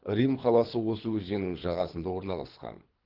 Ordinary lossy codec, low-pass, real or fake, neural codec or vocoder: Opus, 16 kbps; 5.4 kHz; fake; codec, 24 kHz, 3 kbps, HILCodec